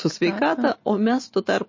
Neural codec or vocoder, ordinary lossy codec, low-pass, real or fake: none; MP3, 32 kbps; 7.2 kHz; real